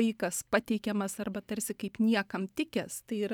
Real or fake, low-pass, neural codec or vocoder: fake; 19.8 kHz; vocoder, 44.1 kHz, 128 mel bands every 512 samples, BigVGAN v2